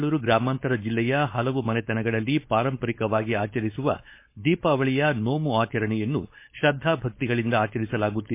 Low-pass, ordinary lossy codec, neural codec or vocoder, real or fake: 3.6 kHz; MP3, 24 kbps; codec, 16 kHz, 4.8 kbps, FACodec; fake